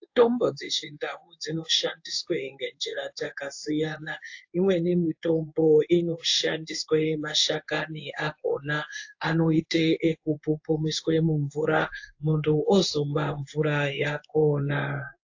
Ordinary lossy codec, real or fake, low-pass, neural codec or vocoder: AAC, 48 kbps; fake; 7.2 kHz; codec, 16 kHz in and 24 kHz out, 1 kbps, XY-Tokenizer